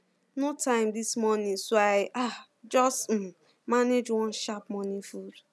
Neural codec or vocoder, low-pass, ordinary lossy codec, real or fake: none; none; none; real